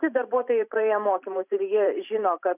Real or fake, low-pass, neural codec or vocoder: fake; 3.6 kHz; vocoder, 44.1 kHz, 128 mel bands every 512 samples, BigVGAN v2